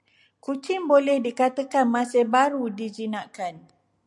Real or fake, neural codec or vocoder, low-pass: real; none; 10.8 kHz